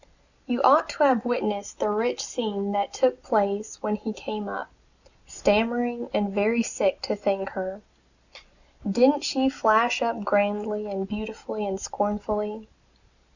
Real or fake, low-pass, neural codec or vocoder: real; 7.2 kHz; none